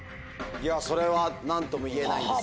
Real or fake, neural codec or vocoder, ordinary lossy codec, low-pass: real; none; none; none